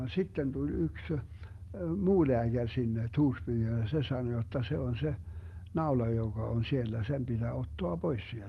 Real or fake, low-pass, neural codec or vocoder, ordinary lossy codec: real; 19.8 kHz; none; Opus, 24 kbps